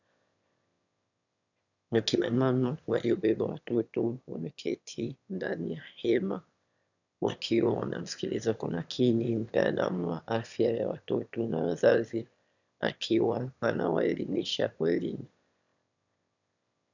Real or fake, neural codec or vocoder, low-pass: fake; autoencoder, 22.05 kHz, a latent of 192 numbers a frame, VITS, trained on one speaker; 7.2 kHz